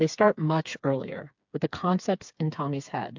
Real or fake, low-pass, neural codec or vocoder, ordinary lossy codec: fake; 7.2 kHz; codec, 16 kHz, 4 kbps, FreqCodec, smaller model; MP3, 64 kbps